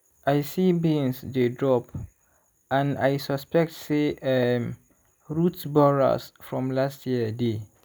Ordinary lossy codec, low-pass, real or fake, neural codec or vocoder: none; none; real; none